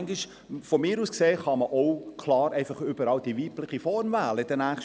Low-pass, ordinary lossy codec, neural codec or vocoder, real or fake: none; none; none; real